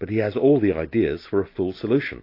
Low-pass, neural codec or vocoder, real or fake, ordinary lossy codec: 5.4 kHz; none; real; AAC, 32 kbps